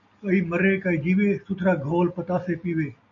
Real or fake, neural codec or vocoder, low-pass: real; none; 7.2 kHz